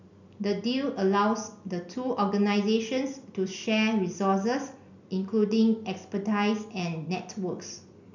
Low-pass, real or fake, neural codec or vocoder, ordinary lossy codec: 7.2 kHz; real; none; none